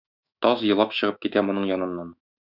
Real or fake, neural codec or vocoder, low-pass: fake; autoencoder, 48 kHz, 128 numbers a frame, DAC-VAE, trained on Japanese speech; 5.4 kHz